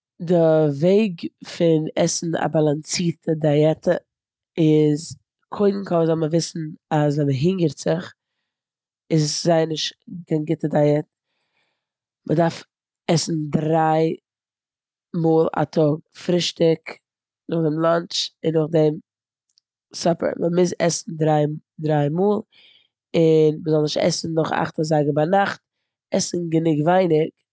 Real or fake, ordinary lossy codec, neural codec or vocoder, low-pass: real; none; none; none